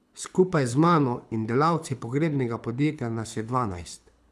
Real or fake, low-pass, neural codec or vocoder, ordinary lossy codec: fake; none; codec, 24 kHz, 6 kbps, HILCodec; none